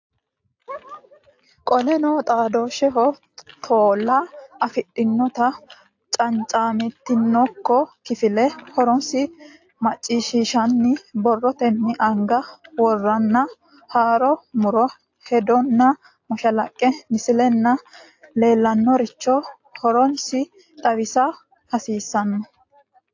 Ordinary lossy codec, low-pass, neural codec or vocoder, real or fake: AAC, 48 kbps; 7.2 kHz; none; real